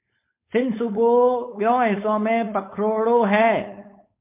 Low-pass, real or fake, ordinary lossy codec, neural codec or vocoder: 3.6 kHz; fake; MP3, 24 kbps; codec, 16 kHz, 4.8 kbps, FACodec